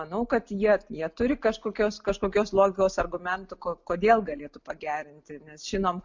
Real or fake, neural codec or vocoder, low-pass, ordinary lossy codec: real; none; 7.2 kHz; MP3, 64 kbps